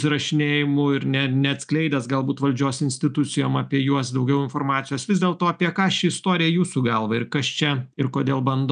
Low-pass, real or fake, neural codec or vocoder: 9.9 kHz; real; none